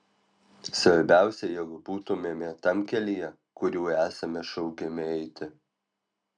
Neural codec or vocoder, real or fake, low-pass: none; real; 9.9 kHz